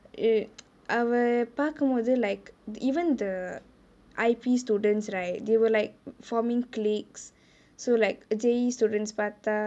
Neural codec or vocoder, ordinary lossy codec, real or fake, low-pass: none; none; real; none